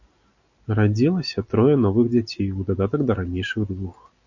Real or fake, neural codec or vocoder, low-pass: real; none; 7.2 kHz